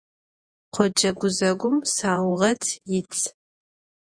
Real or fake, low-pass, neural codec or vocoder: fake; 9.9 kHz; vocoder, 48 kHz, 128 mel bands, Vocos